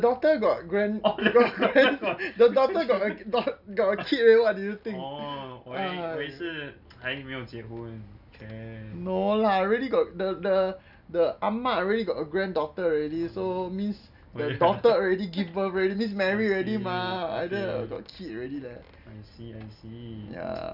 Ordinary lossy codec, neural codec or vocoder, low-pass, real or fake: none; none; 5.4 kHz; real